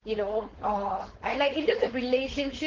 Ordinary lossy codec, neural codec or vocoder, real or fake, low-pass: Opus, 16 kbps; codec, 16 kHz, 4.8 kbps, FACodec; fake; 7.2 kHz